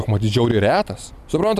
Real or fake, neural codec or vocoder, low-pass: real; none; 14.4 kHz